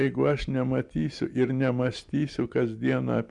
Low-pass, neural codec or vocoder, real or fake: 10.8 kHz; none; real